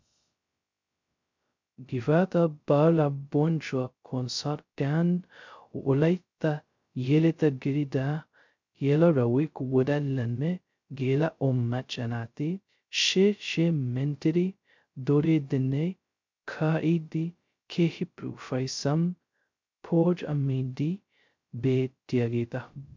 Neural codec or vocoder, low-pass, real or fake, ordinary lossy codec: codec, 16 kHz, 0.2 kbps, FocalCodec; 7.2 kHz; fake; MP3, 64 kbps